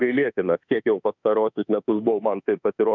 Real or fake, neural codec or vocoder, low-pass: fake; autoencoder, 48 kHz, 32 numbers a frame, DAC-VAE, trained on Japanese speech; 7.2 kHz